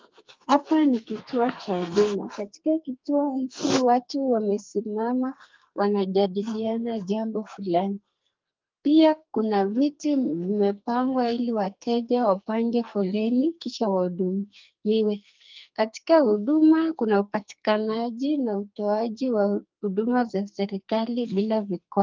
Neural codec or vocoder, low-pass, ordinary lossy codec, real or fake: codec, 44.1 kHz, 2.6 kbps, SNAC; 7.2 kHz; Opus, 24 kbps; fake